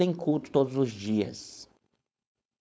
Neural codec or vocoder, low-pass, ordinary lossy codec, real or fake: codec, 16 kHz, 4.8 kbps, FACodec; none; none; fake